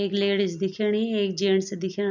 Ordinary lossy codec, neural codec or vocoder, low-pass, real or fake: none; none; 7.2 kHz; real